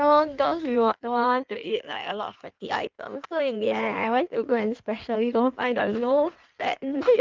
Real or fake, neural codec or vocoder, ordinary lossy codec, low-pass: fake; codec, 16 kHz in and 24 kHz out, 1.1 kbps, FireRedTTS-2 codec; Opus, 24 kbps; 7.2 kHz